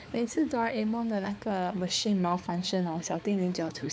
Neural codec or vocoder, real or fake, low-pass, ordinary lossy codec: codec, 16 kHz, 4 kbps, X-Codec, HuBERT features, trained on balanced general audio; fake; none; none